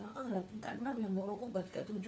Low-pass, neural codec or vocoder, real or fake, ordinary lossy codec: none; codec, 16 kHz, 4 kbps, FunCodec, trained on LibriTTS, 50 frames a second; fake; none